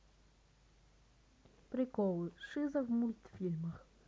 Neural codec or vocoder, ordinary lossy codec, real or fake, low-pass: none; none; real; none